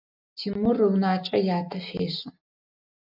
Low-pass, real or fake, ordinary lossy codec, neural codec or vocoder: 5.4 kHz; real; AAC, 48 kbps; none